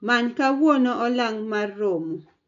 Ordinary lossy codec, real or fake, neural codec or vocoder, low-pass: none; real; none; 7.2 kHz